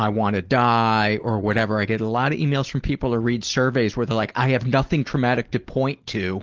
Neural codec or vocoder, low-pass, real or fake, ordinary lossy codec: none; 7.2 kHz; real; Opus, 16 kbps